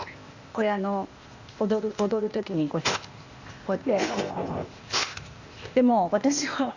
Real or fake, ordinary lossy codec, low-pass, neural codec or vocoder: fake; Opus, 64 kbps; 7.2 kHz; codec, 16 kHz, 0.8 kbps, ZipCodec